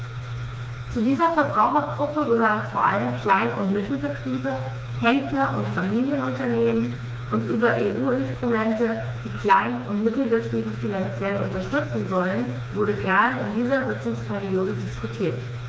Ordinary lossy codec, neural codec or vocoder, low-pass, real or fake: none; codec, 16 kHz, 2 kbps, FreqCodec, smaller model; none; fake